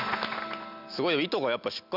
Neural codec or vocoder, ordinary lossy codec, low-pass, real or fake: none; none; 5.4 kHz; real